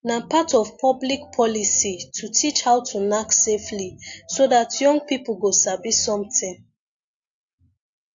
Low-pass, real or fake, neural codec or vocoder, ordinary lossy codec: 7.2 kHz; real; none; AAC, 64 kbps